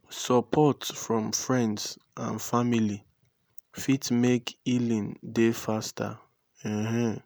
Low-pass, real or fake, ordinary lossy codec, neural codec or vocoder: none; real; none; none